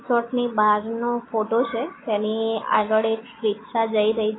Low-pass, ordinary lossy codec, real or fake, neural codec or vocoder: 7.2 kHz; AAC, 16 kbps; real; none